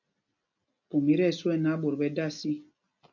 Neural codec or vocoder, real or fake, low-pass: none; real; 7.2 kHz